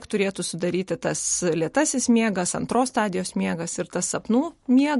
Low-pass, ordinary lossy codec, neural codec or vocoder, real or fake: 14.4 kHz; MP3, 48 kbps; none; real